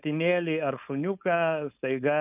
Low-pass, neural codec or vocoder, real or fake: 3.6 kHz; codec, 16 kHz, 4.8 kbps, FACodec; fake